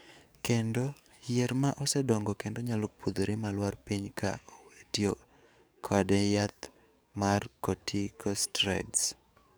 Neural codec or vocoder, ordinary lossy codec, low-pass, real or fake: codec, 44.1 kHz, 7.8 kbps, DAC; none; none; fake